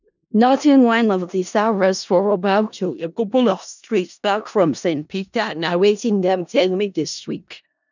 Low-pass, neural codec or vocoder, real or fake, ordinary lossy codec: 7.2 kHz; codec, 16 kHz in and 24 kHz out, 0.4 kbps, LongCat-Audio-Codec, four codebook decoder; fake; none